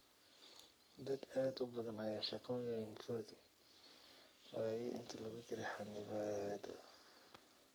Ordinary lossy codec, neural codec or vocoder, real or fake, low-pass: none; codec, 44.1 kHz, 3.4 kbps, Pupu-Codec; fake; none